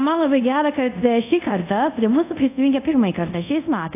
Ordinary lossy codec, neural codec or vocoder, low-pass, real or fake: AAC, 32 kbps; codec, 24 kHz, 0.5 kbps, DualCodec; 3.6 kHz; fake